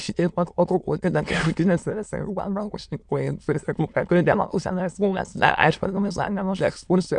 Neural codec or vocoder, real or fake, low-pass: autoencoder, 22.05 kHz, a latent of 192 numbers a frame, VITS, trained on many speakers; fake; 9.9 kHz